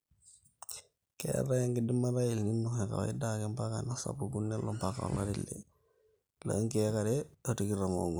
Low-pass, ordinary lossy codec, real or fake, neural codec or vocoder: none; none; real; none